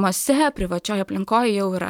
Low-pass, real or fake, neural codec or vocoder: 19.8 kHz; fake; vocoder, 44.1 kHz, 128 mel bands every 512 samples, BigVGAN v2